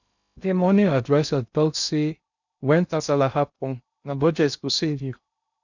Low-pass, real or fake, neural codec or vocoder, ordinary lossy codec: 7.2 kHz; fake; codec, 16 kHz in and 24 kHz out, 0.6 kbps, FocalCodec, streaming, 2048 codes; none